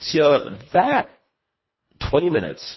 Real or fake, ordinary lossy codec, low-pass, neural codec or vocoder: fake; MP3, 24 kbps; 7.2 kHz; codec, 24 kHz, 1.5 kbps, HILCodec